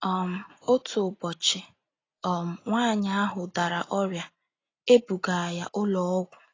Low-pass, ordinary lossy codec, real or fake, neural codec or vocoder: 7.2 kHz; AAC, 32 kbps; real; none